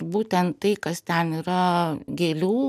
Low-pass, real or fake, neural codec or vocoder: 14.4 kHz; real; none